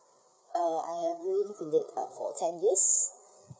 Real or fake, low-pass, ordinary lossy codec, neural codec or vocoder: fake; none; none; codec, 16 kHz, 4 kbps, FreqCodec, larger model